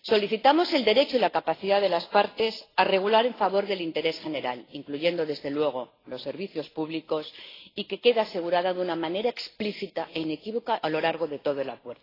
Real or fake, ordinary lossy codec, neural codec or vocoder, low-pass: real; AAC, 24 kbps; none; 5.4 kHz